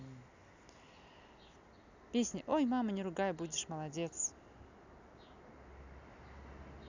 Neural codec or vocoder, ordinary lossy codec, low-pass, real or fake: none; none; 7.2 kHz; real